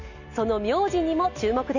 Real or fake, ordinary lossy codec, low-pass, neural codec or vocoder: real; none; 7.2 kHz; none